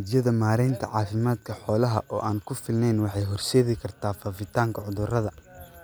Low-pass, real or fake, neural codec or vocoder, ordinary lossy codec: none; real; none; none